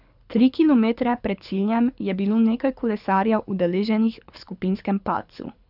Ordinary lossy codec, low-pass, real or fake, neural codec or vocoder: none; 5.4 kHz; fake; codec, 24 kHz, 6 kbps, HILCodec